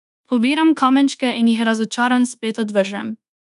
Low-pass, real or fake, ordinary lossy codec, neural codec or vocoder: 10.8 kHz; fake; none; codec, 24 kHz, 1.2 kbps, DualCodec